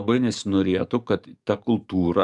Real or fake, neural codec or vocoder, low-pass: fake; codec, 44.1 kHz, 7.8 kbps, DAC; 10.8 kHz